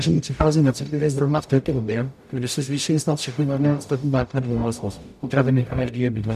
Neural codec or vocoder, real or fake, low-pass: codec, 44.1 kHz, 0.9 kbps, DAC; fake; 14.4 kHz